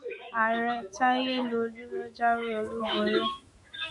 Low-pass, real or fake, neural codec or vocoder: 10.8 kHz; fake; autoencoder, 48 kHz, 128 numbers a frame, DAC-VAE, trained on Japanese speech